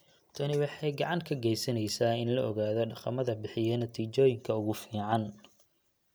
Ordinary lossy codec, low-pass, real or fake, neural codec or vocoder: none; none; real; none